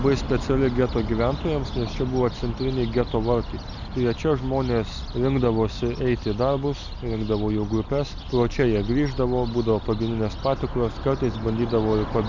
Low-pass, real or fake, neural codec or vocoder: 7.2 kHz; real; none